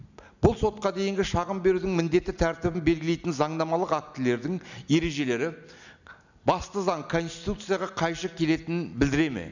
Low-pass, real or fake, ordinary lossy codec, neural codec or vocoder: 7.2 kHz; real; none; none